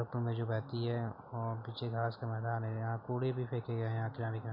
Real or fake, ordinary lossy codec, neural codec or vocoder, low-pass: real; none; none; 5.4 kHz